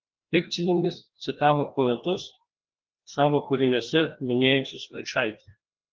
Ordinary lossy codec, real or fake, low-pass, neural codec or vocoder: Opus, 16 kbps; fake; 7.2 kHz; codec, 16 kHz, 1 kbps, FreqCodec, larger model